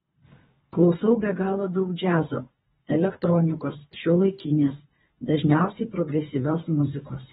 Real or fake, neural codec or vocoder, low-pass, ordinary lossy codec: fake; codec, 24 kHz, 3 kbps, HILCodec; 10.8 kHz; AAC, 16 kbps